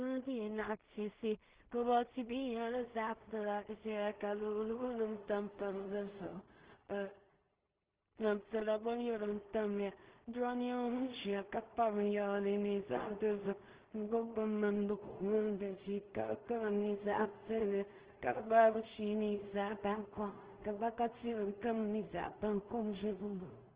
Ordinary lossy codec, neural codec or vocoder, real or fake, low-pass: Opus, 16 kbps; codec, 16 kHz in and 24 kHz out, 0.4 kbps, LongCat-Audio-Codec, two codebook decoder; fake; 3.6 kHz